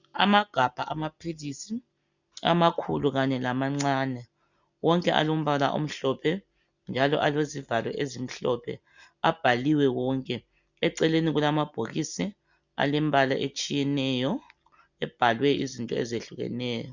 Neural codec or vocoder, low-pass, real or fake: none; 7.2 kHz; real